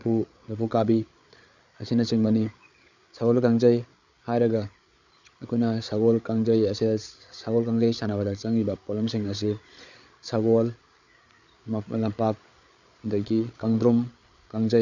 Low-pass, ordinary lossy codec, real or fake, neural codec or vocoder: 7.2 kHz; none; fake; codec, 16 kHz, 8 kbps, FreqCodec, larger model